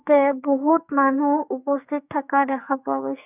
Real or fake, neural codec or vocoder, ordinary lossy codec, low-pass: fake; codec, 44.1 kHz, 2.6 kbps, SNAC; none; 3.6 kHz